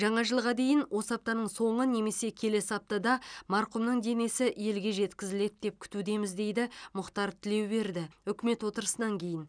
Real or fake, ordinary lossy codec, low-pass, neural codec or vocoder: real; none; 9.9 kHz; none